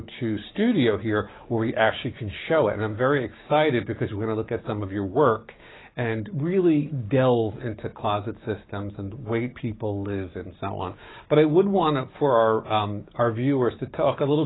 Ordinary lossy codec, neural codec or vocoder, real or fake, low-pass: AAC, 16 kbps; codec, 16 kHz, 6 kbps, DAC; fake; 7.2 kHz